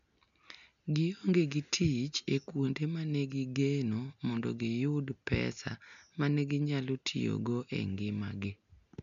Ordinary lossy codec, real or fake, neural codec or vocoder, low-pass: none; real; none; 7.2 kHz